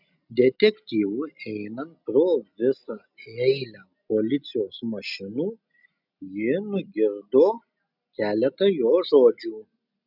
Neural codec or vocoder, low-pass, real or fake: none; 5.4 kHz; real